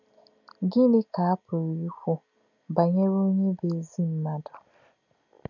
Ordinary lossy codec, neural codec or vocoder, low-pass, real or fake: none; none; 7.2 kHz; real